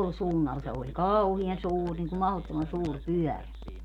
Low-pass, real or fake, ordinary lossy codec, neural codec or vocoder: 19.8 kHz; real; none; none